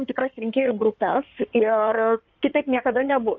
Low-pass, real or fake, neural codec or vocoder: 7.2 kHz; fake; codec, 16 kHz in and 24 kHz out, 2.2 kbps, FireRedTTS-2 codec